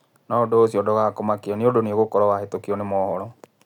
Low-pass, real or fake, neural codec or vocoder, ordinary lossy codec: 19.8 kHz; real; none; none